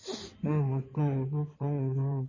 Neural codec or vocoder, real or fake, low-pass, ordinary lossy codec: codec, 16 kHz in and 24 kHz out, 2.2 kbps, FireRedTTS-2 codec; fake; 7.2 kHz; MP3, 32 kbps